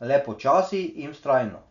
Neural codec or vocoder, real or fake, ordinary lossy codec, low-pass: none; real; none; 7.2 kHz